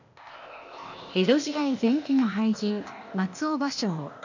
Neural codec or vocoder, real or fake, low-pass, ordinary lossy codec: codec, 16 kHz, 0.8 kbps, ZipCodec; fake; 7.2 kHz; none